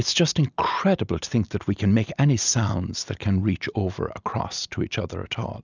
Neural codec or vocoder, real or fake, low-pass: none; real; 7.2 kHz